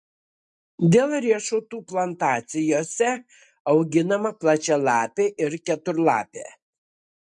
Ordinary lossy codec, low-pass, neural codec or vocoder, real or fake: MP3, 64 kbps; 10.8 kHz; none; real